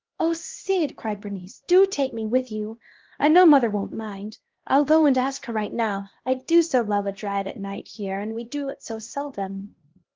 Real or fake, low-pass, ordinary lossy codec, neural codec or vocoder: fake; 7.2 kHz; Opus, 16 kbps; codec, 16 kHz, 1 kbps, X-Codec, HuBERT features, trained on LibriSpeech